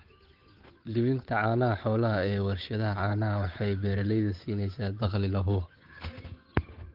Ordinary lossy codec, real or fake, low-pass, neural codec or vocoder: Opus, 24 kbps; fake; 5.4 kHz; codec, 16 kHz, 8 kbps, FunCodec, trained on Chinese and English, 25 frames a second